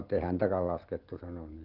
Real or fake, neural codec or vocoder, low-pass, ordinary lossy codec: real; none; 5.4 kHz; Opus, 16 kbps